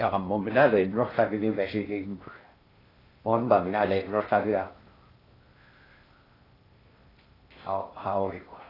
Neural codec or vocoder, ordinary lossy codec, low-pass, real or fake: codec, 16 kHz in and 24 kHz out, 0.6 kbps, FocalCodec, streaming, 2048 codes; AAC, 24 kbps; 5.4 kHz; fake